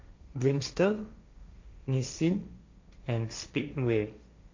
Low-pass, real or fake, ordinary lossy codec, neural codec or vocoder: 7.2 kHz; fake; MP3, 64 kbps; codec, 16 kHz, 1.1 kbps, Voila-Tokenizer